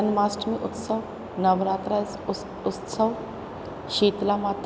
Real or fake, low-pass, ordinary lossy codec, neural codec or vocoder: real; none; none; none